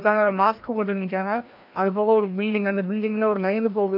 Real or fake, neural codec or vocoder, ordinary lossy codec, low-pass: fake; codec, 16 kHz, 1 kbps, FreqCodec, larger model; MP3, 48 kbps; 5.4 kHz